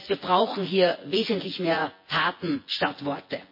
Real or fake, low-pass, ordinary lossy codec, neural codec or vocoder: fake; 5.4 kHz; MP3, 24 kbps; vocoder, 24 kHz, 100 mel bands, Vocos